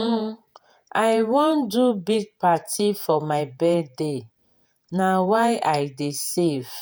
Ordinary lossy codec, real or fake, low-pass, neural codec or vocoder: none; fake; none; vocoder, 48 kHz, 128 mel bands, Vocos